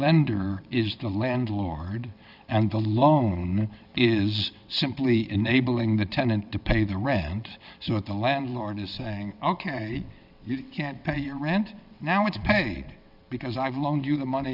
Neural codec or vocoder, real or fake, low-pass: vocoder, 22.05 kHz, 80 mel bands, WaveNeXt; fake; 5.4 kHz